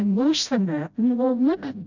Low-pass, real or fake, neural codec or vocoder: 7.2 kHz; fake; codec, 16 kHz, 0.5 kbps, FreqCodec, smaller model